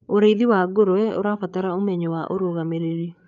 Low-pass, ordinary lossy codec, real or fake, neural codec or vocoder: 7.2 kHz; none; fake; codec, 16 kHz, 8 kbps, FreqCodec, larger model